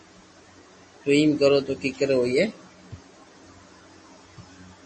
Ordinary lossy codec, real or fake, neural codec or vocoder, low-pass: MP3, 32 kbps; real; none; 10.8 kHz